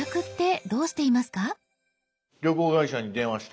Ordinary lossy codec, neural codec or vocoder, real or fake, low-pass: none; none; real; none